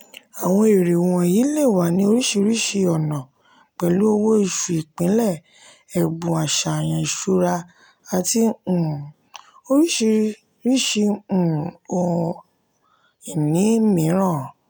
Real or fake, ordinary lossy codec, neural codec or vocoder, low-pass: real; none; none; none